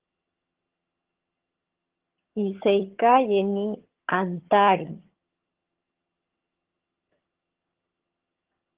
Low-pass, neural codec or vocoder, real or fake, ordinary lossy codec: 3.6 kHz; vocoder, 22.05 kHz, 80 mel bands, HiFi-GAN; fake; Opus, 16 kbps